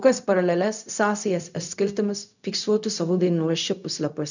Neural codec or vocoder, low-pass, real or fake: codec, 16 kHz, 0.4 kbps, LongCat-Audio-Codec; 7.2 kHz; fake